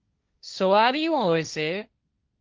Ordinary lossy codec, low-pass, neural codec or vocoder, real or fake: Opus, 32 kbps; 7.2 kHz; codec, 16 kHz, 1.1 kbps, Voila-Tokenizer; fake